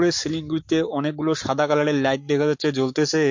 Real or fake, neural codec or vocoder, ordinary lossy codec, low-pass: fake; codec, 44.1 kHz, 7.8 kbps, Pupu-Codec; MP3, 48 kbps; 7.2 kHz